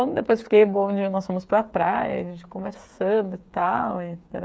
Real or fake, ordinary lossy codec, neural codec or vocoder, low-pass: fake; none; codec, 16 kHz, 8 kbps, FreqCodec, smaller model; none